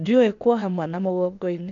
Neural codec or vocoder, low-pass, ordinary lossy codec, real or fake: codec, 16 kHz, 0.8 kbps, ZipCodec; 7.2 kHz; none; fake